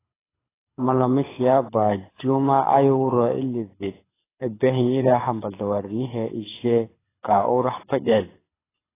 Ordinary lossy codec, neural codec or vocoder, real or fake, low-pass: AAC, 16 kbps; codec, 24 kHz, 6 kbps, HILCodec; fake; 3.6 kHz